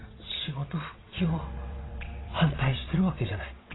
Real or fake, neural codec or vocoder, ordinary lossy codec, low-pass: fake; vocoder, 22.05 kHz, 80 mel bands, WaveNeXt; AAC, 16 kbps; 7.2 kHz